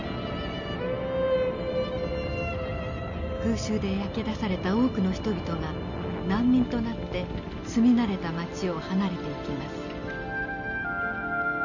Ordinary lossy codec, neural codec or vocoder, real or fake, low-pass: none; none; real; 7.2 kHz